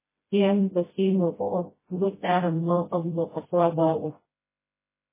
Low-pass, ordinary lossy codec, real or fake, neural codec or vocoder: 3.6 kHz; MP3, 16 kbps; fake; codec, 16 kHz, 0.5 kbps, FreqCodec, smaller model